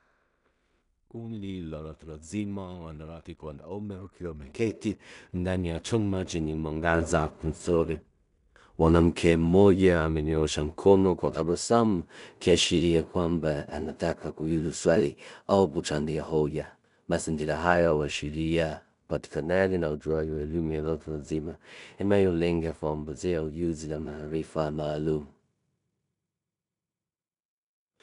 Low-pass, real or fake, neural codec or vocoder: 10.8 kHz; fake; codec, 16 kHz in and 24 kHz out, 0.4 kbps, LongCat-Audio-Codec, two codebook decoder